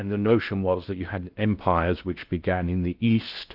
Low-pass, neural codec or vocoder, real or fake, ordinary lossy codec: 5.4 kHz; codec, 16 kHz in and 24 kHz out, 0.6 kbps, FocalCodec, streaming, 2048 codes; fake; Opus, 32 kbps